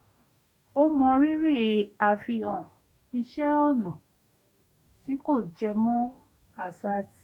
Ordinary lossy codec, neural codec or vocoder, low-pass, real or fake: none; codec, 44.1 kHz, 2.6 kbps, DAC; 19.8 kHz; fake